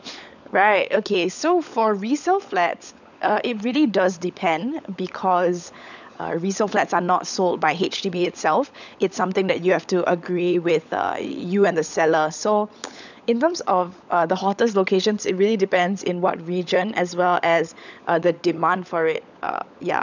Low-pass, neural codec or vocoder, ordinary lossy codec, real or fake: 7.2 kHz; codec, 16 kHz, 16 kbps, FunCodec, trained on LibriTTS, 50 frames a second; none; fake